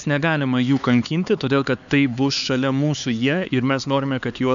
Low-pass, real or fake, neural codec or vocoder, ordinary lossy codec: 7.2 kHz; fake; codec, 16 kHz, 4 kbps, X-Codec, HuBERT features, trained on LibriSpeech; MP3, 96 kbps